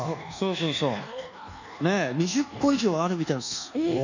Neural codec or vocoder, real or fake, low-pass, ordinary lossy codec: codec, 24 kHz, 1.2 kbps, DualCodec; fake; 7.2 kHz; none